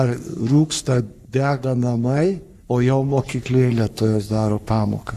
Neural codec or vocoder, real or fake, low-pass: codec, 44.1 kHz, 3.4 kbps, Pupu-Codec; fake; 14.4 kHz